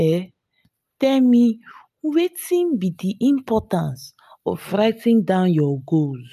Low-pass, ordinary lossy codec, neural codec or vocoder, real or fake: 14.4 kHz; none; none; real